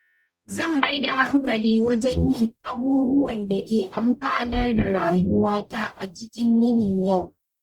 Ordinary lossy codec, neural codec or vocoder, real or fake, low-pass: Opus, 64 kbps; codec, 44.1 kHz, 0.9 kbps, DAC; fake; 19.8 kHz